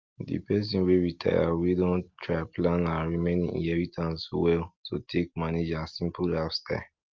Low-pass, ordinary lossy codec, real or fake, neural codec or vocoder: 7.2 kHz; Opus, 24 kbps; real; none